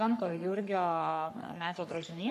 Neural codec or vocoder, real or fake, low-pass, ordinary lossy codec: codec, 44.1 kHz, 3.4 kbps, Pupu-Codec; fake; 14.4 kHz; MP3, 96 kbps